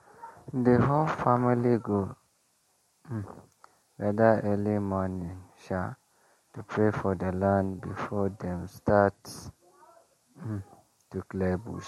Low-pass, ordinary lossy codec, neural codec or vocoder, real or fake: 19.8 kHz; MP3, 64 kbps; none; real